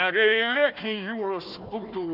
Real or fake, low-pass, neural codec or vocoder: fake; 5.4 kHz; codec, 16 kHz, 1 kbps, FunCodec, trained on Chinese and English, 50 frames a second